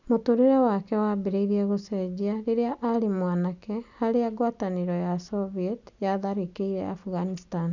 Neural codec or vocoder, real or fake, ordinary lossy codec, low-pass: none; real; none; 7.2 kHz